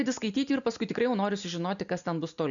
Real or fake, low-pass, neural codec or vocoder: real; 7.2 kHz; none